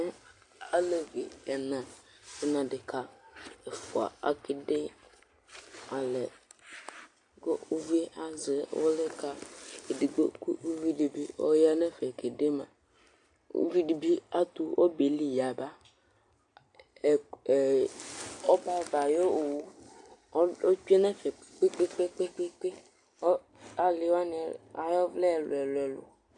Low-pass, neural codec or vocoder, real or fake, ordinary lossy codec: 10.8 kHz; none; real; AAC, 64 kbps